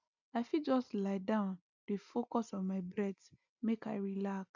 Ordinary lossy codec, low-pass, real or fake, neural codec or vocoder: none; 7.2 kHz; real; none